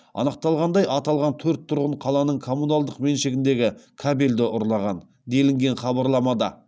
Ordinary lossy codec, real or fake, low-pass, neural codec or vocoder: none; real; none; none